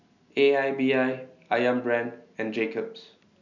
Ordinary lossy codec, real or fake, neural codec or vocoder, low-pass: none; real; none; 7.2 kHz